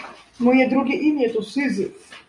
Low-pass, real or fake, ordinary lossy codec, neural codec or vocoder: 10.8 kHz; real; MP3, 96 kbps; none